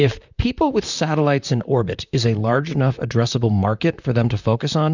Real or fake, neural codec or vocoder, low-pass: fake; vocoder, 44.1 kHz, 128 mel bands, Pupu-Vocoder; 7.2 kHz